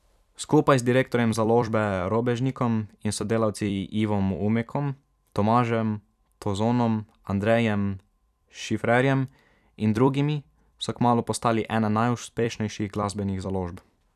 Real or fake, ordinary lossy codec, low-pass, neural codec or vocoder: fake; none; 14.4 kHz; vocoder, 44.1 kHz, 128 mel bands every 256 samples, BigVGAN v2